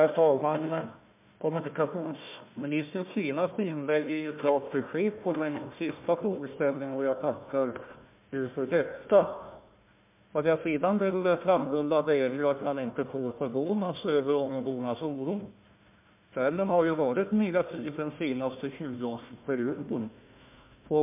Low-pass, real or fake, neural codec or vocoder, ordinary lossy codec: 3.6 kHz; fake; codec, 16 kHz, 1 kbps, FunCodec, trained on Chinese and English, 50 frames a second; MP3, 32 kbps